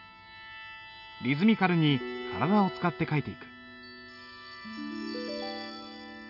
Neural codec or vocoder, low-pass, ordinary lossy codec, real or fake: none; 5.4 kHz; none; real